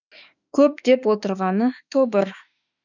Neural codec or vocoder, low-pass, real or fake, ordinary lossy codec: autoencoder, 48 kHz, 32 numbers a frame, DAC-VAE, trained on Japanese speech; 7.2 kHz; fake; none